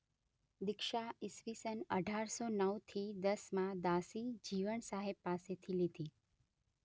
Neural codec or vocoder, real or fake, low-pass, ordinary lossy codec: none; real; none; none